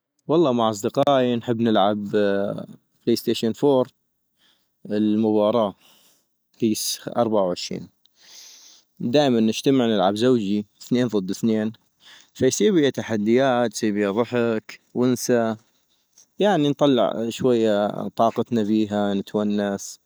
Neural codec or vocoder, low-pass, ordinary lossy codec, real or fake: none; none; none; real